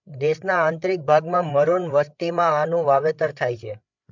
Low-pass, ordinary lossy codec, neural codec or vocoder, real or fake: 7.2 kHz; MP3, 64 kbps; codec, 16 kHz, 8 kbps, FreqCodec, larger model; fake